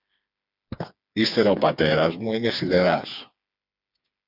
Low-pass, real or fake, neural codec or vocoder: 5.4 kHz; fake; codec, 16 kHz, 4 kbps, FreqCodec, smaller model